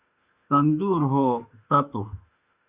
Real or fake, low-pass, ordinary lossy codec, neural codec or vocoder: fake; 3.6 kHz; Opus, 64 kbps; autoencoder, 48 kHz, 32 numbers a frame, DAC-VAE, trained on Japanese speech